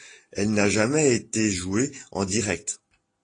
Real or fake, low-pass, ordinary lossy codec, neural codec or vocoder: real; 9.9 kHz; AAC, 32 kbps; none